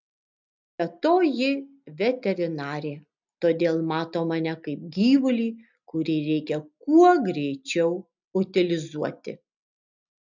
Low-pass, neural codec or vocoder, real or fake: 7.2 kHz; none; real